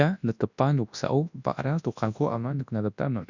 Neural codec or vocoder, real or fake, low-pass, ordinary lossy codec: codec, 24 kHz, 0.9 kbps, WavTokenizer, large speech release; fake; 7.2 kHz; none